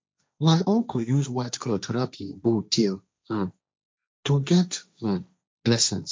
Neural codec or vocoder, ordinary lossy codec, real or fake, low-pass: codec, 16 kHz, 1.1 kbps, Voila-Tokenizer; none; fake; none